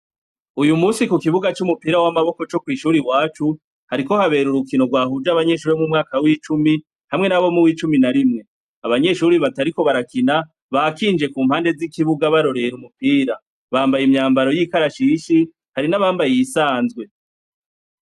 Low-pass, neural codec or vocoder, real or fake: 14.4 kHz; none; real